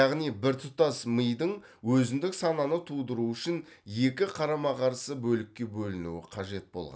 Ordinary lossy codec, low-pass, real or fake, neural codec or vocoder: none; none; real; none